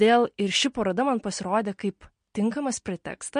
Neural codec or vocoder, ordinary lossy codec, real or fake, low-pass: none; MP3, 48 kbps; real; 9.9 kHz